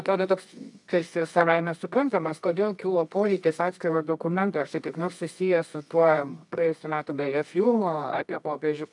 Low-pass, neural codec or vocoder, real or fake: 10.8 kHz; codec, 24 kHz, 0.9 kbps, WavTokenizer, medium music audio release; fake